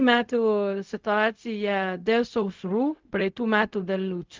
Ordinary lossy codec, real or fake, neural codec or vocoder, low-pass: Opus, 32 kbps; fake; codec, 16 kHz, 0.4 kbps, LongCat-Audio-Codec; 7.2 kHz